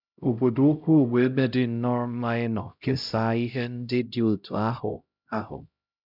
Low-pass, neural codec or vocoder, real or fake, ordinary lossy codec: 5.4 kHz; codec, 16 kHz, 0.5 kbps, X-Codec, HuBERT features, trained on LibriSpeech; fake; none